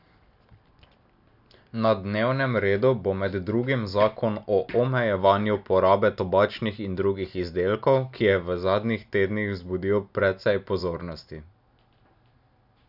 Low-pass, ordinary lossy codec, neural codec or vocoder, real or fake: 5.4 kHz; none; none; real